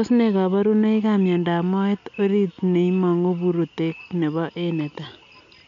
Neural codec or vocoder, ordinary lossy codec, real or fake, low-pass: none; none; real; 7.2 kHz